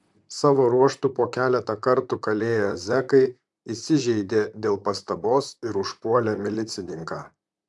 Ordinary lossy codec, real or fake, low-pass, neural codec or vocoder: MP3, 96 kbps; fake; 10.8 kHz; vocoder, 44.1 kHz, 128 mel bands, Pupu-Vocoder